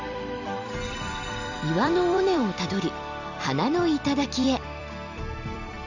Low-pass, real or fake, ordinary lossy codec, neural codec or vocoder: 7.2 kHz; fake; none; vocoder, 44.1 kHz, 128 mel bands every 256 samples, BigVGAN v2